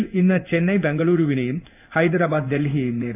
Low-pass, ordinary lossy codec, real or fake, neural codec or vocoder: 3.6 kHz; none; fake; codec, 16 kHz in and 24 kHz out, 1 kbps, XY-Tokenizer